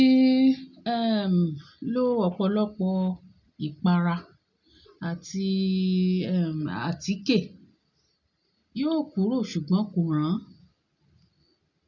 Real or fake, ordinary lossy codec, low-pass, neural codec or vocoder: real; none; 7.2 kHz; none